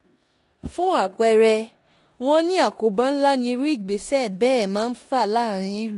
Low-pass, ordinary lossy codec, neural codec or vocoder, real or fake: 10.8 kHz; AAC, 48 kbps; codec, 16 kHz in and 24 kHz out, 0.9 kbps, LongCat-Audio-Codec, four codebook decoder; fake